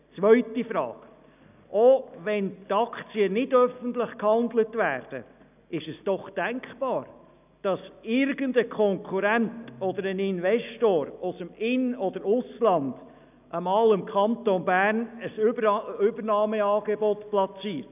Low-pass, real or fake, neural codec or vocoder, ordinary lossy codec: 3.6 kHz; real; none; none